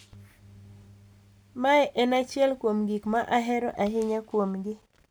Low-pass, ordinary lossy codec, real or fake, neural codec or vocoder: none; none; real; none